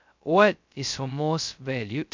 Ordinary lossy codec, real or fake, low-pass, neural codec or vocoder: MP3, 64 kbps; fake; 7.2 kHz; codec, 16 kHz, 0.2 kbps, FocalCodec